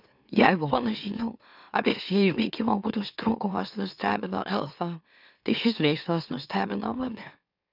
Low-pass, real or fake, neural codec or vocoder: 5.4 kHz; fake; autoencoder, 44.1 kHz, a latent of 192 numbers a frame, MeloTTS